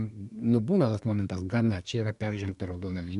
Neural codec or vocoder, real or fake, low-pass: codec, 24 kHz, 1 kbps, SNAC; fake; 10.8 kHz